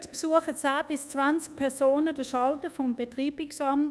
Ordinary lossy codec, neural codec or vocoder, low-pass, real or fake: none; codec, 24 kHz, 1.2 kbps, DualCodec; none; fake